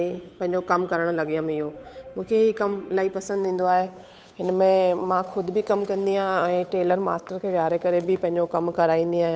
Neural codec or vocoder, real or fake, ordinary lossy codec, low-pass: codec, 16 kHz, 8 kbps, FunCodec, trained on Chinese and English, 25 frames a second; fake; none; none